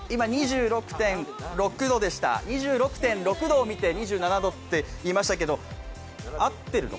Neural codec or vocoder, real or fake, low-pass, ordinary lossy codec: none; real; none; none